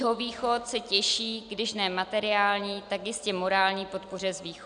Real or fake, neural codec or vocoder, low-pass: real; none; 9.9 kHz